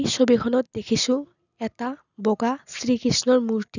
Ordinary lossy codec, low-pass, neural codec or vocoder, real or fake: none; 7.2 kHz; none; real